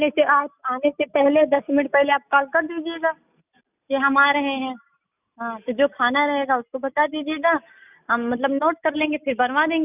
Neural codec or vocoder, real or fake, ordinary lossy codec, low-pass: none; real; none; 3.6 kHz